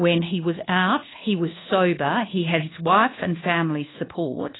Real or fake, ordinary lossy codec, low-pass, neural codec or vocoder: fake; AAC, 16 kbps; 7.2 kHz; codec, 24 kHz, 1.2 kbps, DualCodec